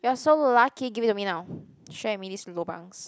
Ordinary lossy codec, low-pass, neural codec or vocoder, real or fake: none; none; none; real